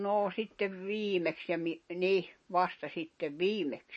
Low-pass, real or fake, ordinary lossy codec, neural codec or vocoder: 10.8 kHz; real; MP3, 32 kbps; none